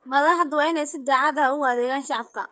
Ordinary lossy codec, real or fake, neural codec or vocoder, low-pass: none; fake; codec, 16 kHz, 8 kbps, FreqCodec, smaller model; none